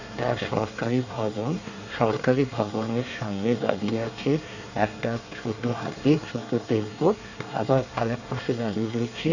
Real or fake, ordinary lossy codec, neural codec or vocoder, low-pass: fake; none; codec, 24 kHz, 1 kbps, SNAC; 7.2 kHz